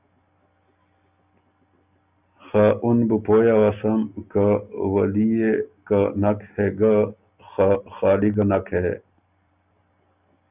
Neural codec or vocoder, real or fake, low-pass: none; real; 3.6 kHz